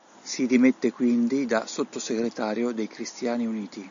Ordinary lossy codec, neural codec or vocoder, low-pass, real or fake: AAC, 64 kbps; none; 7.2 kHz; real